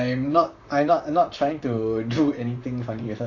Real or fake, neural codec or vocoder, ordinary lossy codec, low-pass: fake; vocoder, 44.1 kHz, 128 mel bands, Pupu-Vocoder; AAC, 48 kbps; 7.2 kHz